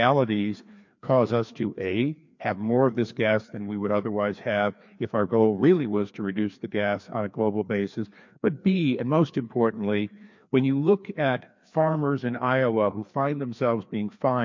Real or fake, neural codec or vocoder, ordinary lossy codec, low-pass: fake; codec, 16 kHz, 2 kbps, FreqCodec, larger model; MP3, 48 kbps; 7.2 kHz